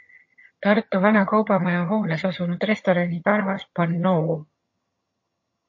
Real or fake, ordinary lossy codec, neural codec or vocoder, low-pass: fake; MP3, 32 kbps; vocoder, 22.05 kHz, 80 mel bands, HiFi-GAN; 7.2 kHz